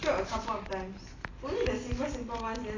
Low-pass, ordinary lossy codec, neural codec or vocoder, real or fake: 7.2 kHz; AAC, 32 kbps; none; real